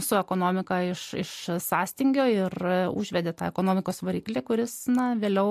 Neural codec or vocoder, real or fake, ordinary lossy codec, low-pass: none; real; MP3, 64 kbps; 14.4 kHz